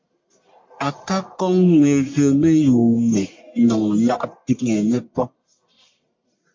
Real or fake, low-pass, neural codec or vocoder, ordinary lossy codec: fake; 7.2 kHz; codec, 44.1 kHz, 1.7 kbps, Pupu-Codec; MP3, 48 kbps